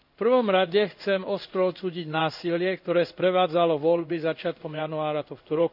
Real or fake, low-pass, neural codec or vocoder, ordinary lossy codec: fake; 5.4 kHz; codec, 16 kHz in and 24 kHz out, 1 kbps, XY-Tokenizer; none